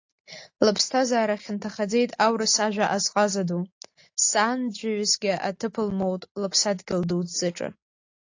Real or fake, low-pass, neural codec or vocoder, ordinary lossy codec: real; 7.2 kHz; none; AAC, 48 kbps